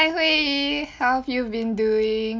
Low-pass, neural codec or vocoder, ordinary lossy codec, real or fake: none; none; none; real